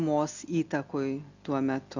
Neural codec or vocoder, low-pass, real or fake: none; 7.2 kHz; real